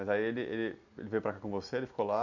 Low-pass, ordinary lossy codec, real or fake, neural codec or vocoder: 7.2 kHz; none; real; none